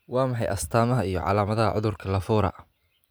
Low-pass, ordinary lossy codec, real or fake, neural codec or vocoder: none; none; real; none